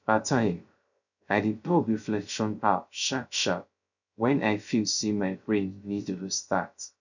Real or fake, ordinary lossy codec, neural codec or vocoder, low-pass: fake; none; codec, 16 kHz, 0.3 kbps, FocalCodec; 7.2 kHz